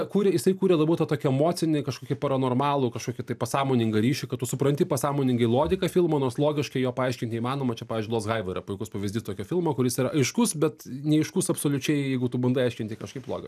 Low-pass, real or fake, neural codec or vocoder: 14.4 kHz; real; none